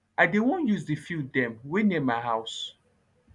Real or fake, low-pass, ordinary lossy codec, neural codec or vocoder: real; 10.8 kHz; none; none